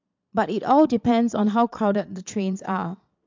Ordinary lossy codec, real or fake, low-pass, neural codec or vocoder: MP3, 64 kbps; fake; 7.2 kHz; vocoder, 22.05 kHz, 80 mel bands, Vocos